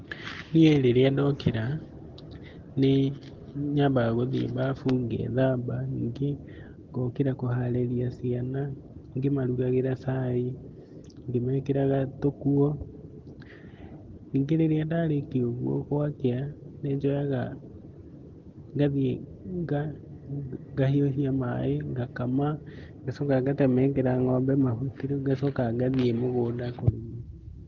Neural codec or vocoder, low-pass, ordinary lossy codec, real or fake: none; 7.2 kHz; Opus, 16 kbps; real